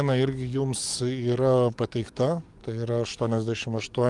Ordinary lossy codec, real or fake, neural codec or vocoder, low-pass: Opus, 32 kbps; fake; codec, 44.1 kHz, 7.8 kbps, DAC; 10.8 kHz